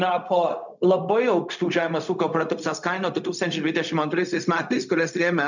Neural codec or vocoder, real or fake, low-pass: codec, 16 kHz, 0.4 kbps, LongCat-Audio-Codec; fake; 7.2 kHz